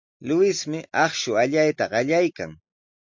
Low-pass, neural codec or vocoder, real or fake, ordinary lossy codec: 7.2 kHz; none; real; MP3, 48 kbps